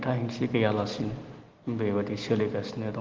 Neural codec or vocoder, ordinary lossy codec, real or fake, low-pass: none; Opus, 16 kbps; real; 7.2 kHz